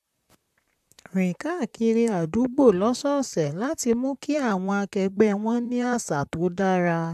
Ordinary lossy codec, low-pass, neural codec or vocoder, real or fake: AAC, 96 kbps; 14.4 kHz; vocoder, 44.1 kHz, 128 mel bands, Pupu-Vocoder; fake